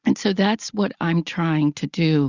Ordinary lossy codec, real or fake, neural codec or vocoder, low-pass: Opus, 64 kbps; real; none; 7.2 kHz